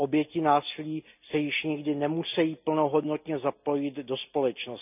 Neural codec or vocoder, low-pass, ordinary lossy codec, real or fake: none; 3.6 kHz; none; real